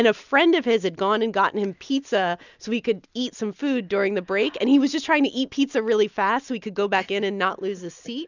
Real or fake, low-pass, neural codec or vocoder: real; 7.2 kHz; none